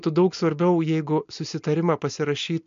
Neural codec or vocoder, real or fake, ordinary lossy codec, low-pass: none; real; MP3, 48 kbps; 7.2 kHz